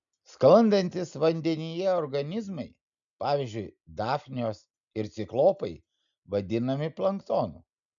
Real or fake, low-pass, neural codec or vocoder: real; 7.2 kHz; none